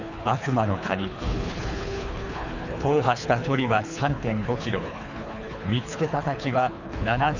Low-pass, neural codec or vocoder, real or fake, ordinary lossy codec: 7.2 kHz; codec, 24 kHz, 3 kbps, HILCodec; fake; none